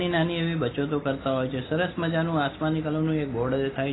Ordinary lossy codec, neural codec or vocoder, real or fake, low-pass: AAC, 16 kbps; none; real; 7.2 kHz